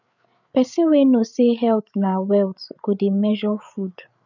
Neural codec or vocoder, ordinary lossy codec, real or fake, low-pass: codec, 16 kHz, 8 kbps, FreqCodec, larger model; none; fake; 7.2 kHz